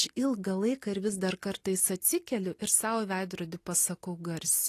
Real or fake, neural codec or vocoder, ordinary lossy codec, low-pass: real; none; AAC, 48 kbps; 14.4 kHz